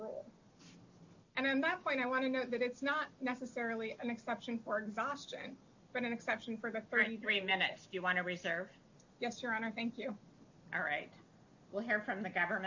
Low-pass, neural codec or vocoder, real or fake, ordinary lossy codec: 7.2 kHz; none; real; AAC, 48 kbps